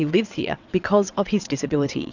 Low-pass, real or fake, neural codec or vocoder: 7.2 kHz; fake; vocoder, 22.05 kHz, 80 mel bands, WaveNeXt